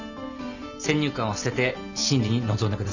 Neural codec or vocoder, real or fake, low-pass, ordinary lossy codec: none; real; 7.2 kHz; none